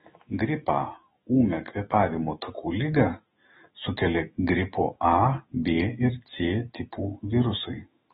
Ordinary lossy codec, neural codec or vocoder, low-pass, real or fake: AAC, 16 kbps; autoencoder, 48 kHz, 128 numbers a frame, DAC-VAE, trained on Japanese speech; 19.8 kHz; fake